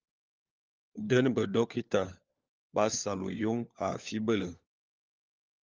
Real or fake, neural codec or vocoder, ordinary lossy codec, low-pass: fake; codec, 16 kHz, 4 kbps, FunCodec, trained on LibriTTS, 50 frames a second; Opus, 24 kbps; 7.2 kHz